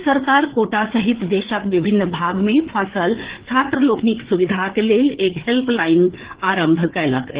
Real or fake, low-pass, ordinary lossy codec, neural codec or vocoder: fake; 3.6 kHz; Opus, 16 kbps; codec, 16 kHz, 4 kbps, FreqCodec, larger model